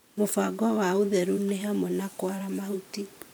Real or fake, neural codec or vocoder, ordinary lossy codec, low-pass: fake; vocoder, 44.1 kHz, 128 mel bands every 512 samples, BigVGAN v2; none; none